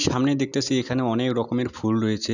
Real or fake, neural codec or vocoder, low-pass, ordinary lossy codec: real; none; 7.2 kHz; none